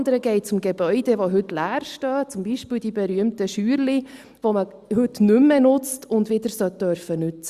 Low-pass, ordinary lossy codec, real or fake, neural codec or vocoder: 14.4 kHz; Opus, 64 kbps; real; none